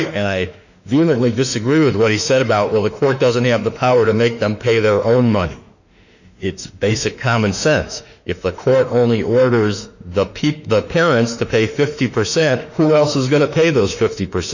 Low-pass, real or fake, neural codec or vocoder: 7.2 kHz; fake; autoencoder, 48 kHz, 32 numbers a frame, DAC-VAE, trained on Japanese speech